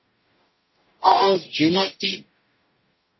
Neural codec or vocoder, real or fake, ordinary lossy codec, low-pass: codec, 44.1 kHz, 0.9 kbps, DAC; fake; MP3, 24 kbps; 7.2 kHz